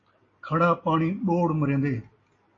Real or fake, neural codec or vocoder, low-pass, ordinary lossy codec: real; none; 7.2 kHz; AAC, 32 kbps